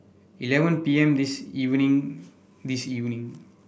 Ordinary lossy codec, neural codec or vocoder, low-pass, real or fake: none; none; none; real